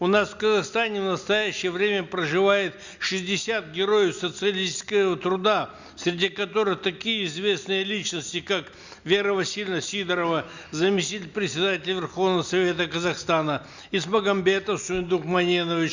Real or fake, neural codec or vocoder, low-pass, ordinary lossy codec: real; none; 7.2 kHz; Opus, 64 kbps